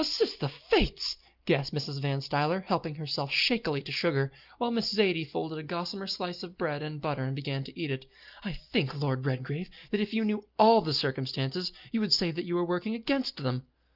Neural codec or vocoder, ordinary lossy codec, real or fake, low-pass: none; Opus, 32 kbps; real; 5.4 kHz